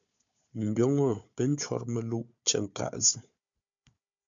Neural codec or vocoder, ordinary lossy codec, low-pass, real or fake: codec, 16 kHz, 4 kbps, FunCodec, trained on Chinese and English, 50 frames a second; MP3, 64 kbps; 7.2 kHz; fake